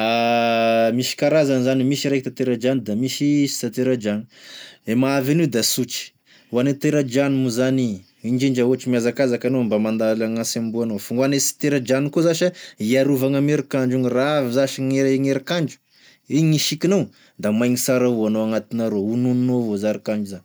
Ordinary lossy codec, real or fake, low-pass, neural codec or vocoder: none; real; none; none